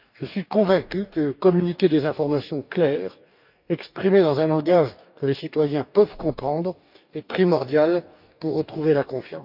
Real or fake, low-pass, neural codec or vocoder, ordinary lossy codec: fake; 5.4 kHz; codec, 44.1 kHz, 2.6 kbps, DAC; none